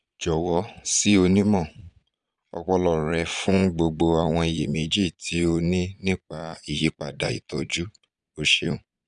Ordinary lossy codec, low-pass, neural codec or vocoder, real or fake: none; 9.9 kHz; vocoder, 22.05 kHz, 80 mel bands, Vocos; fake